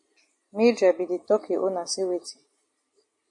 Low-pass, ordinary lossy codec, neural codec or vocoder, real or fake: 10.8 kHz; MP3, 48 kbps; none; real